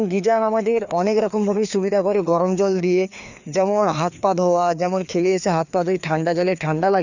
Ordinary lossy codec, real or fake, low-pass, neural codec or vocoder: none; fake; 7.2 kHz; codec, 16 kHz, 2 kbps, FreqCodec, larger model